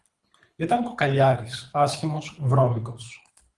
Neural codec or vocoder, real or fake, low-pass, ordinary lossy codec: codec, 24 kHz, 3 kbps, HILCodec; fake; 10.8 kHz; Opus, 32 kbps